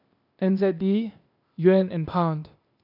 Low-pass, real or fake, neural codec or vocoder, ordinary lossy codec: 5.4 kHz; fake; codec, 16 kHz, 0.8 kbps, ZipCodec; none